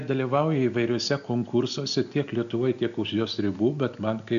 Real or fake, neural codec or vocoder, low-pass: real; none; 7.2 kHz